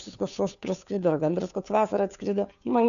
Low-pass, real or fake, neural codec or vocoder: 7.2 kHz; fake; codec, 16 kHz, 2 kbps, FunCodec, trained on Chinese and English, 25 frames a second